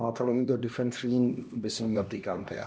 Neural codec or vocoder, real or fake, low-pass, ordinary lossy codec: codec, 16 kHz, 1 kbps, X-Codec, HuBERT features, trained on LibriSpeech; fake; none; none